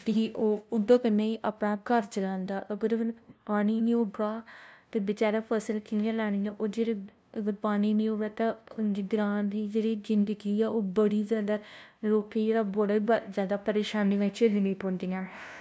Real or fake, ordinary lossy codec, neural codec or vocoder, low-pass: fake; none; codec, 16 kHz, 0.5 kbps, FunCodec, trained on LibriTTS, 25 frames a second; none